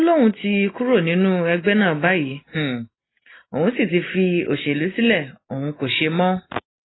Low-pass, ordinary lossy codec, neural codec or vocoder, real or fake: 7.2 kHz; AAC, 16 kbps; none; real